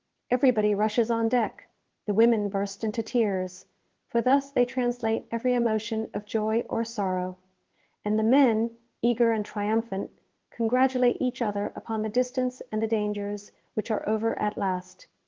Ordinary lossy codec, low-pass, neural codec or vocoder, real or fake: Opus, 16 kbps; 7.2 kHz; codec, 16 kHz in and 24 kHz out, 1 kbps, XY-Tokenizer; fake